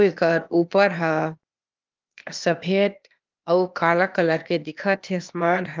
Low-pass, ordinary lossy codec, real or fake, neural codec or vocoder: 7.2 kHz; Opus, 24 kbps; fake; codec, 16 kHz, 0.8 kbps, ZipCodec